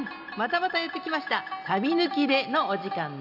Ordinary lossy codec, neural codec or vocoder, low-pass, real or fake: none; none; 5.4 kHz; real